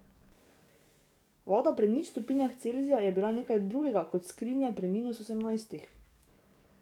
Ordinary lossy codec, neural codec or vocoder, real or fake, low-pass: none; codec, 44.1 kHz, 7.8 kbps, DAC; fake; 19.8 kHz